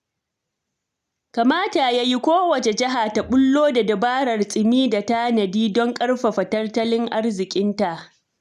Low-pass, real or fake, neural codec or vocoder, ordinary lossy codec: 14.4 kHz; real; none; none